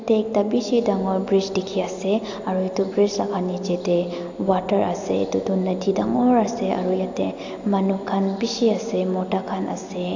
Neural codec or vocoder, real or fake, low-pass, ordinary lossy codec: none; real; 7.2 kHz; AAC, 48 kbps